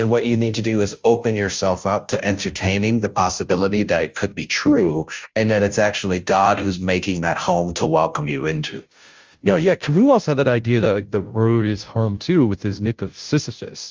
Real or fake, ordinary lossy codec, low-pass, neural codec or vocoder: fake; Opus, 32 kbps; 7.2 kHz; codec, 16 kHz, 0.5 kbps, FunCodec, trained on Chinese and English, 25 frames a second